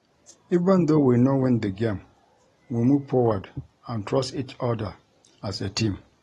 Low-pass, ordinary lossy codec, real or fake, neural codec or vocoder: 19.8 kHz; AAC, 32 kbps; real; none